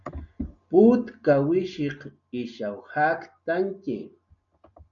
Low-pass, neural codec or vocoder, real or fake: 7.2 kHz; none; real